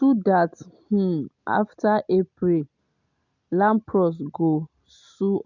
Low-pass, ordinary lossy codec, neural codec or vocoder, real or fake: 7.2 kHz; none; none; real